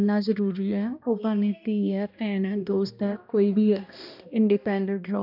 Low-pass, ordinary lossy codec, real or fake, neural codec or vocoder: 5.4 kHz; none; fake; codec, 16 kHz, 1 kbps, X-Codec, HuBERT features, trained on balanced general audio